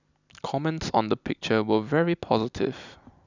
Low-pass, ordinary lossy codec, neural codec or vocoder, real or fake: 7.2 kHz; none; none; real